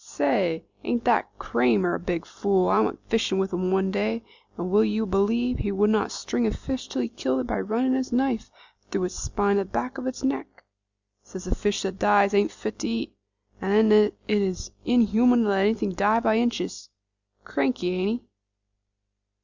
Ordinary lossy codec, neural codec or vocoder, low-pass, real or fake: Opus, 64 kbps; none; 7.2 kHz; real